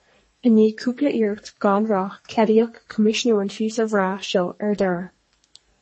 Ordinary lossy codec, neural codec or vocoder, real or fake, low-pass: MP3, 32 kbps; codec, 44.1 kHz, 2.6 kbps, SNAC; fake; 10.8 kHz